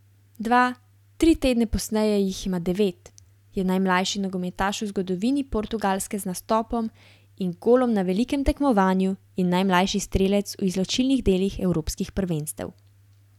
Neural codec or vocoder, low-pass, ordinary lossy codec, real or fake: none; 19.8 kHz; none; real